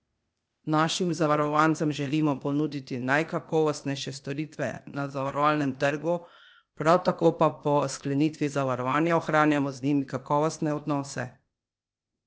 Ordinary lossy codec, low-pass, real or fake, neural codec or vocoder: none; none; fake; codec, 16 kHz, 0.8 kbps, ZipCodec